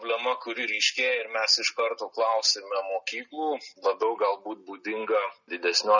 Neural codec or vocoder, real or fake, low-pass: none; real; 7.2 kHz